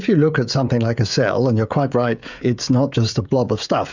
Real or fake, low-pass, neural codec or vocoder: fake; 7.2 kHz; codec, 16 kHz, 16 kbps, FreqCodec, smaller model